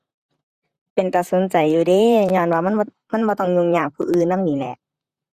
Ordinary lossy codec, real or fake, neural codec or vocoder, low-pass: Opus, 64 kbps; fake; vocoder, 44.1 kHz, 128 mel bands, Pupu-Vocoder; 14.4 kHz